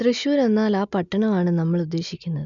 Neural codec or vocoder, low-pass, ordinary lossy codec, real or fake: none; 7.2 kHz; MP3, 96 kbps; real